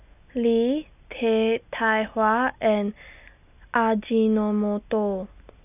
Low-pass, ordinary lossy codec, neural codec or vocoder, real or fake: 3.6 kHz; none; none; real